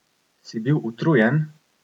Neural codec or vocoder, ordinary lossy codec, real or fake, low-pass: none; none; real; 19.8 kHz